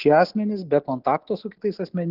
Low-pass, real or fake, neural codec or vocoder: 5.4 kHz; real; none